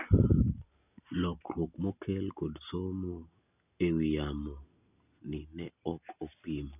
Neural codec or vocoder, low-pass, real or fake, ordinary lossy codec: none; 3.6 kHz; real; none